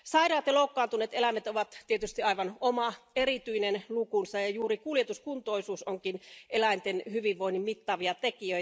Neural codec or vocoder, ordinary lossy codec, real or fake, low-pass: none; none; real; none